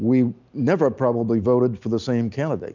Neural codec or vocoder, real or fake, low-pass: none; real; 7.2 kHz